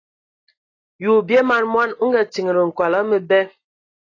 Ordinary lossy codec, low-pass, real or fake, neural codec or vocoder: AAC, 32 kbps; 7.2 kHz; real; none